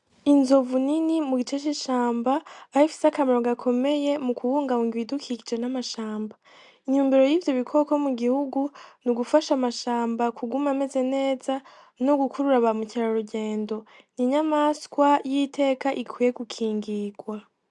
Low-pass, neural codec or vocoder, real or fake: 10.8 kHz; none; real